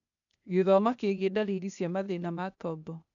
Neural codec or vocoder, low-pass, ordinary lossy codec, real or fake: codec, 16 kHz, 0.8 kbps, ZipCodec; 7.2 kHz; none; fake